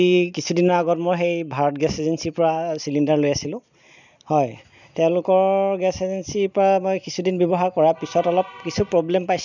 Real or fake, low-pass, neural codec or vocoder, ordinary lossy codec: real; 7.2 kHz; none; none